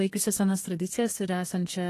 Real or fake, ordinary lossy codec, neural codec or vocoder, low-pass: fake; AAC, 48 kbps; codec, 32 kHz, 1.9 kbps, SNAC; 14.4 kHz